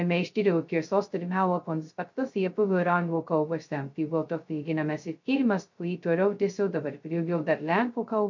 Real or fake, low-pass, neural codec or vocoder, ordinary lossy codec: fake; 7.2 kHz; codec, 16 kHz, 0.2 kbps, FocalCodec; MP3, 48 kbps